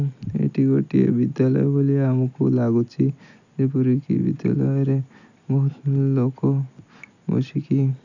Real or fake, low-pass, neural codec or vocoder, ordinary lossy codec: real; 7.2 kHz; none; none